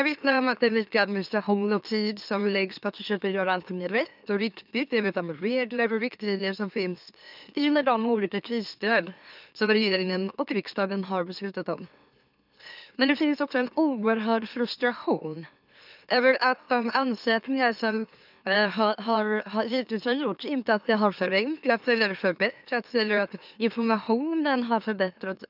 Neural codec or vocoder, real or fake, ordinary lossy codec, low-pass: autoencoder, 44.1 kHz, a latent of 192 numbers a frame, MeloTTS; fake; none; 5.4 kHz